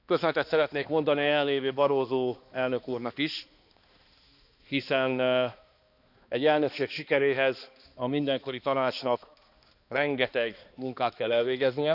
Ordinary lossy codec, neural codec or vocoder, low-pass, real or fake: none; codec, 16 kHz, 2 kbps, X-Codec, HuBERT features, trained on balanced general audio; 5.4 kHz; fake